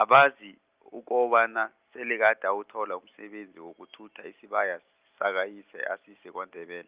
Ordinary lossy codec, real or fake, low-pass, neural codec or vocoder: Opus, 24 kbps; real; 3.6 kHz; none